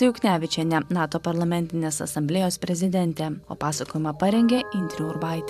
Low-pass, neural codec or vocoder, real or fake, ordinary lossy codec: 14.4 kHz; vocoder, 44.1 kHz, 128 mel bands every 512 samples, BigVGAN v2; fake; AAC, 96 kbps